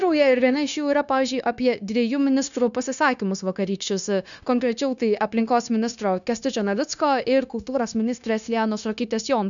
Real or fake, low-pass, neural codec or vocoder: fake; 7.2 kHz; codec, 16 kHz, 0.9 kbps, LongCat-Audio-Codec